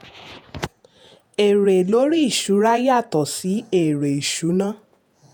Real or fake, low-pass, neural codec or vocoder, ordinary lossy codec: fake; none; vocoder, 48 kHz, 128 mel bands, Vocos; none